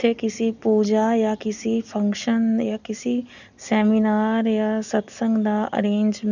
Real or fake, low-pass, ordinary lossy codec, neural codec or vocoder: real; 7.2 kHz; none; none